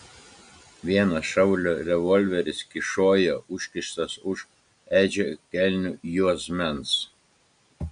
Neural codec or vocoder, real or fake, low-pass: none; real; 9.9 kHz